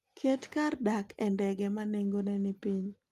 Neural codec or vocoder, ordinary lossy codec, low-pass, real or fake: none; Opus, 32 kbps; 14.4 kHz; real